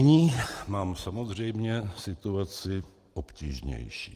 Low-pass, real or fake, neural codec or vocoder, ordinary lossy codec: 14.4 kHz; real; none; Opus, 24 kbps